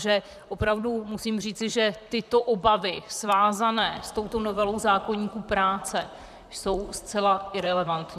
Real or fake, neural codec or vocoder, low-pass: fake; vocoder, 44.1 kHz, 128 mel bands, Pupu-Vocoder; 14.4 kHz